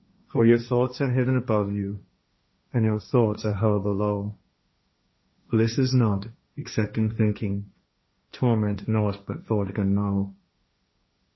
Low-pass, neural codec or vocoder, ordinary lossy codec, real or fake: 7.2 kHz; codec, 16 kHz, 1.1 kbps, Voila-Tokenizer; MP3, 24 kbps; fake